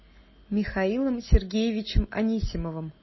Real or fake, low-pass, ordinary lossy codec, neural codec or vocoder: real; 7.2 kHz; MP3, 24 kbps; none